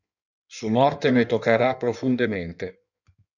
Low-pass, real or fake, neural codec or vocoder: 7.2 kHz; fake; codec, 16 kHz in and 24 kHz out, 1.1 kbps, FireRedTTS-2 codec